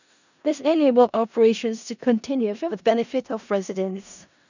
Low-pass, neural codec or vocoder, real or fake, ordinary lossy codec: 7.2 kHz; codec, 16 kHz in and 24 kHz out, 0.4 kbps, LongCat-Audio-Codec, four codebook decoder; fake; none